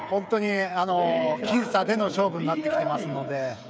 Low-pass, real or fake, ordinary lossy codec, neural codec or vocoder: none; fake; none; codec, 16 kHz, 8 kbps, FreqCodec, smaller model